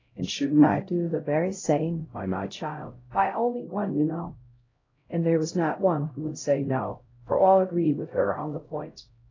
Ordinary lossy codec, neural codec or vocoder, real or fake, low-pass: AAC, 32 kbps; codec, 16 kHz, 0.5 kbps, X-Codec, HuBERT features, trained on LibriSpeech; fake; 7.2 kHz